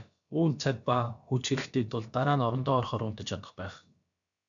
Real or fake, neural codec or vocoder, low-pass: fake; codec, 16 kHz, about 1 kbps, DyCAST, with the encoder's durations; 7.2 kHz